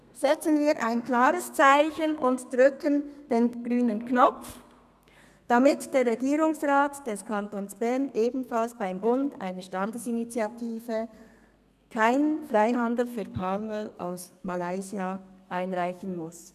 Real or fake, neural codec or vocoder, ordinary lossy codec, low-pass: fake; codec, 32 kHz, 1.9 kbps, SNAC; none; 14.4 kHz